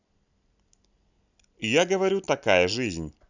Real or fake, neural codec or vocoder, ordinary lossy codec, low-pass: real; none; none; 7.2 kHz